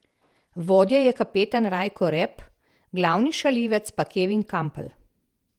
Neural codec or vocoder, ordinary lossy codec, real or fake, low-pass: vocoder, 48 kHz, 128 mel bands, Vocos; Opus, 24 kbps; fake; 19.8 kHz